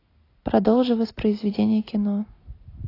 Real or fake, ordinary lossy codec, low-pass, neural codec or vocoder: real; AAC, 24 kbps; 5.4 kHz; none